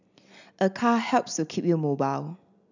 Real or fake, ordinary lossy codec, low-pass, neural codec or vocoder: real; none; 7.2 kHz; none